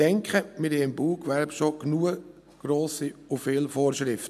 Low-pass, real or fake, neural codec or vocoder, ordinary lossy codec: 14.4 kHz; real; none; none